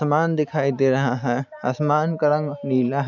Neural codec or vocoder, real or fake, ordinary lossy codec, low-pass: none; real; none; 7.2 kHz